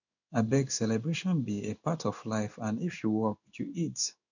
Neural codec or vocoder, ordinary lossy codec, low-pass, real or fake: codec, 16 kHz in and 24 kHz out, 1 kbps, XY-Tokenizer; none; 7.2 kHz; fake